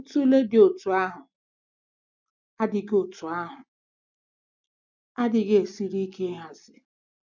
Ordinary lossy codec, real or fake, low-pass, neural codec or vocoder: none; real; 7.2 kHz; none